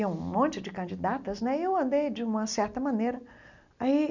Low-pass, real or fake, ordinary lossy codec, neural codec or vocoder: 7.2 kHz; real; none; none